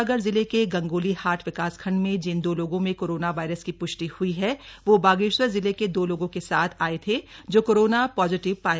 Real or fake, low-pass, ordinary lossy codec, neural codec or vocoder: real; none; none; none